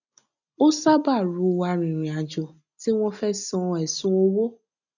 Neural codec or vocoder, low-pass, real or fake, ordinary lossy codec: none; 7.2 kHz; real; none